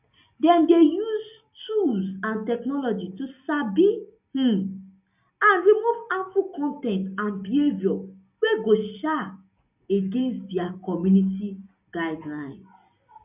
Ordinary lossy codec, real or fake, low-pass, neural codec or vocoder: none; real; 3.6 kHz; none